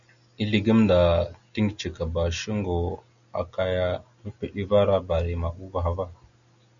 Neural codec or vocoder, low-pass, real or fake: none; 7.2 kHz; real